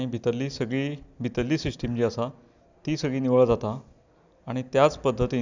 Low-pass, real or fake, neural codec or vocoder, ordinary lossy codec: 7.2 kHz; real; none; none